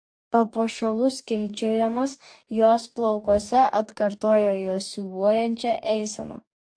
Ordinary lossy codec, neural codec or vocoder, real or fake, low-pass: AAC, 64 kbps; codec, 44.1 kHz, 2.6 kbps, DAC; fake; 9.9 kHz